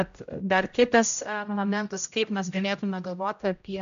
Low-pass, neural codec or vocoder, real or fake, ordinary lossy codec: 7.2 kHz; codec, 16 kHz, 0.5 kbps, X-Codec, HuBERT features, trained on general audio; fake; MP3, 64 kbps